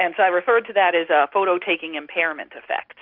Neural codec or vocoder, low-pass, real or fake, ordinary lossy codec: none; 5.4 kHz; real; Opus, 64 kbps